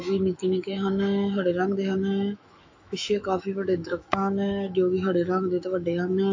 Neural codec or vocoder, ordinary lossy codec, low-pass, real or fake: autoencoder, 48 kHz, 128 numbers a frame, DAC-VAE, trained on Japanese speech; AAC, 48 kbps; 7.2 kHz; fake